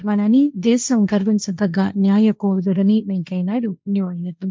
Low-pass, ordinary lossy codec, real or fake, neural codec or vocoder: none; none; fake; codec, 16 kHz, 1.1 kbps, Voila-Tokenizer